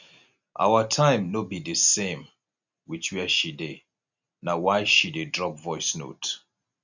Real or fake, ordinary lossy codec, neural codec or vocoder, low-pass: real; none; none; 7.2 kHz